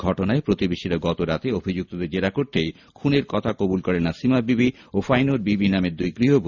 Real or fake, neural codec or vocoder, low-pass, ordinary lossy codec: real; none; 7.2 kHz; none